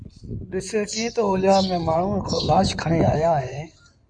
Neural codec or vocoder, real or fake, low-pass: codec, 16 kHz in and 24 kHz out, 2.2 kbps, FireRedTTS-2 codec; fake; 9.9 kHz